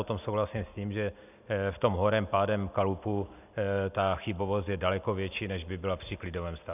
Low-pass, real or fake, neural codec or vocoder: 3.6 kHz; real; none